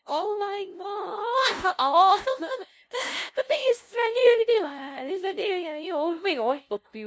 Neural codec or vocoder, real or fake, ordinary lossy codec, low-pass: codec, 16 kHz, 0.5 kbps, FunCodec, trained on LibriTTS, 25 frames a second; fake; none; none